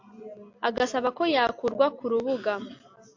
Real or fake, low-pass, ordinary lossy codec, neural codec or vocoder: real; 7.2 kHz; AAC, 48 kbps; none